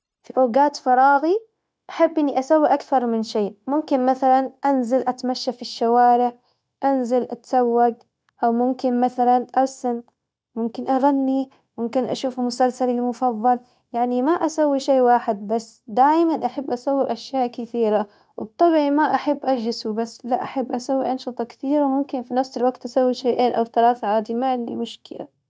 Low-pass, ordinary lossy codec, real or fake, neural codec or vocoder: none; none; fake; codec, 16 kHz, 0.9 kbps, LongCat-Audio-Codec